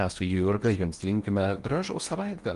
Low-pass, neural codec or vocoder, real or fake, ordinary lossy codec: 10.8 kHz; codec, 16 kHz in and 24 kHz out, 0.8 kbps, FocalCodec, streaming, 65536 codes; fake; Opus, 32 kbps